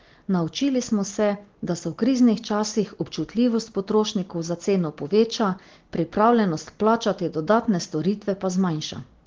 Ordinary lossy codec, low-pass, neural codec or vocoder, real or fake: Opus, 16 kbps; 7.2 kHz; none; real